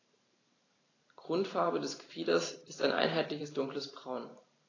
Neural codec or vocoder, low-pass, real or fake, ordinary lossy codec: vocoder, 44.1 kHz, 128 mel bands every 512 samples, BigVGAN v2; 7.2 kHz; fake; AAC, 32 kbps